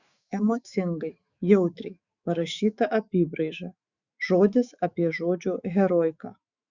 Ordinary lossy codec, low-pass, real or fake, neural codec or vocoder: Opus, 64 kbps; 7.2 kHz; fake; autoencoder, 48 kHz, 128 numbers a frame, DAC-VAE, trained on Japanese speech